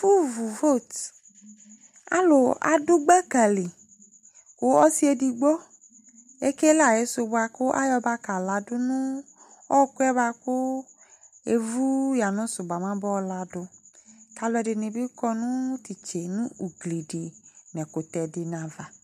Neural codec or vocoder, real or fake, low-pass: none; real; 14.4 kHz